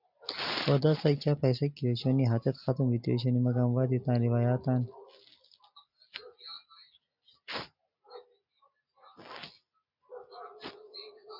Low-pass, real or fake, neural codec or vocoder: 5.4 kHz; real; none